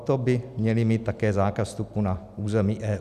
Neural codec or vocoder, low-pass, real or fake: none; 14.4 kHz; real